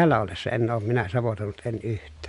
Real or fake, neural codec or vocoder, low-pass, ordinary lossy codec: real; none; 10.8 kHz; MP3, 64 kbps